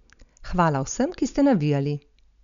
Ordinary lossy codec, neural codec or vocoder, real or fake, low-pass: none; none; real; 7.2 kHz